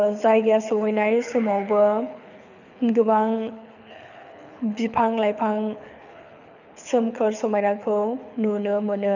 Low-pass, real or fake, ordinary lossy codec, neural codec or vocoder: 7.2 kHz; fake; none; codec, 24 kHz, 6 kbps, HILCodec